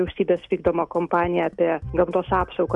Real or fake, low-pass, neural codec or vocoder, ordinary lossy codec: real; 10.8 kHz; none; MP3, 96 kbps